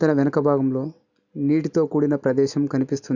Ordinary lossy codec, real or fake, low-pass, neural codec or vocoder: none; real; 7.2 kHz; none